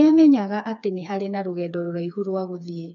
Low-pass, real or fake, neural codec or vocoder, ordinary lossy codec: 7.2 kHz; fake; codec, 16 kHz, 4 kbps, FreqCodec, smaller model; none